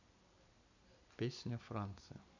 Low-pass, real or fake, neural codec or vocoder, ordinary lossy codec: 7.2 kHz; real; none; none